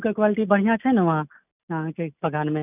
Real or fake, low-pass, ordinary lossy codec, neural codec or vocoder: real; 3.6 kHz; none; none